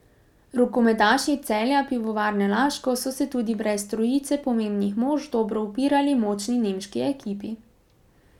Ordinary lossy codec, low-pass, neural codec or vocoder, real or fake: none; 19.8 kHz; none; real